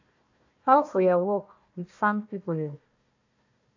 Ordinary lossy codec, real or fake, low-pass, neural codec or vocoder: AAC, 48 kbps; fake; 7.2 kHz; codec, 16 kHz, 1 kbps, FunCodec, trained on Chinese and English, 50 frames a second